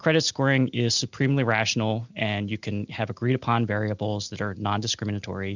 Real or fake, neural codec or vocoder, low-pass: real; none; 7.2 kHz